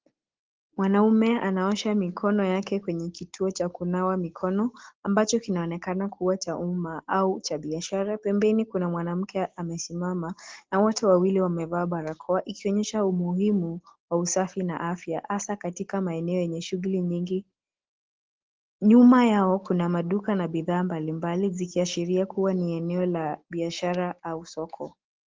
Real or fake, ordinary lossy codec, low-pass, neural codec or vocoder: real; Opus, 16 kbps; 7.2 kHz; none